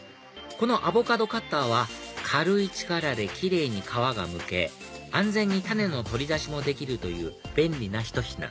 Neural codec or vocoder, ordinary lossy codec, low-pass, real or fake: none; none; none; real